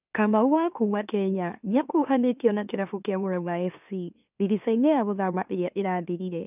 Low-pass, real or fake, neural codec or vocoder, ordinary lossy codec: 3.6 kHz; fake; autoencoder, 44.1 kHz, a latent of 192 numbers a frame, MeloTTS; none